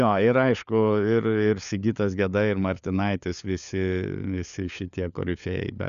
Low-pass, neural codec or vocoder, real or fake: 7.2 kHz; codec, 16 kHz, 4 kbps, FunCodec, trained on LibriTTS, 50 frames a second; fake